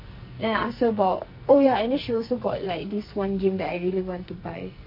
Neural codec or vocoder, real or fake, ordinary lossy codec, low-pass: codec, 44.1 kHz, 2.6 kbps, SNAC; fake; MP3, 32 kbps; 5.4 kHz